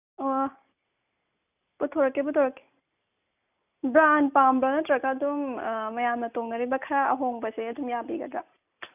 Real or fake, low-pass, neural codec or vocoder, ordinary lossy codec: real; 3.6 kHz; none; none